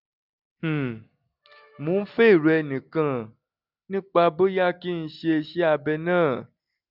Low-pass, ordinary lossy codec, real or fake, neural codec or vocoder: 5.4 kHz; none; real; none